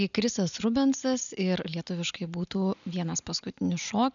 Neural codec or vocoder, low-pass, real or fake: none; 7.2 kHz; real